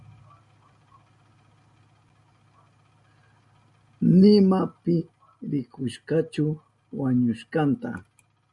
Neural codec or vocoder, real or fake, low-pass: none; real; 10.8 kHz